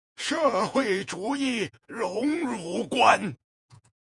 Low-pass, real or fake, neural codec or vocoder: 10.8 kHz; fake; vocoder, 48 kHz, 128 mel bands, Vocos